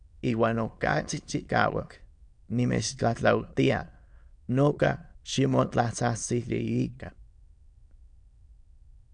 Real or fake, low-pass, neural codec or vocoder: fake; 9.9 kHz; autoencoder, 22.05 kHz, a latent of 192 numbers a frame, VITS, trained on many speakers